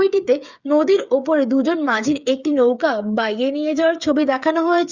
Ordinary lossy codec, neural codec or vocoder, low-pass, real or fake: Opus, 64 kbps; codec, 16 kHz, 8 kbps, FreqCodec, smaller model; 7.2 kHz; fake